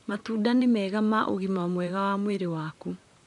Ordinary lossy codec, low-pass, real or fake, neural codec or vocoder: none; 10.8 kHz; fake; vocoder, 44.1 kHz, 128 mel bands, Pupu-Vocoder